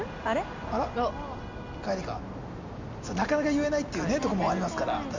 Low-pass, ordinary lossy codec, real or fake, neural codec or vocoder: 7.2 kHz; MP3, 64 kbps; real; none